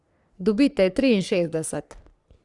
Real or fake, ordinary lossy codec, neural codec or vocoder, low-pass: fake; Opus, 64 kbps; codec, 44.1 kHz, 3.4 kbps, Pupu-Codec; 10.8 kHz